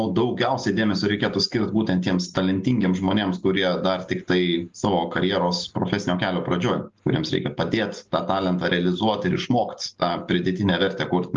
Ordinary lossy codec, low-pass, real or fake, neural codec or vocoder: Opus, 32 kbps; 7.2 kHz; real; none